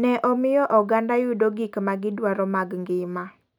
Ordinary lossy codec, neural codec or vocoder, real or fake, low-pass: none; none; real; 19.8 kHz